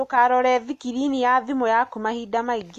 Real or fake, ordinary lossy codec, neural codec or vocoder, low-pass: real; AAC, 64 kbps; none; 14.4 kHz